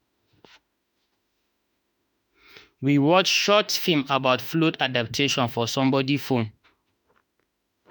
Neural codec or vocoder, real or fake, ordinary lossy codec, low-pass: autoencoder, 48 kHz, 32 numbers a frame, DAC-VAE, trained on Japanese speech; fake; none; none